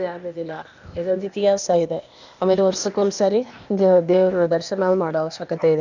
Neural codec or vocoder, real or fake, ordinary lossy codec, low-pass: codec, 16 kHz, 0.8 kbps, ZipCodec; fake; none; 7.2 kHz